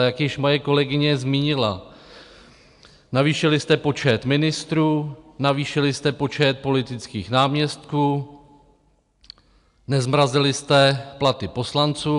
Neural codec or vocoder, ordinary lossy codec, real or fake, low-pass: none; AAC, 96 kbps; real; 10.8 kHz